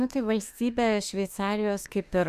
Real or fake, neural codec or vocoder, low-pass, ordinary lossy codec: fake; autoencoder, 48 kHz, 32 numbers a frame, DAC-VAE, trained on Japanese speech; 14.4 kHz; Opus, 64 kbps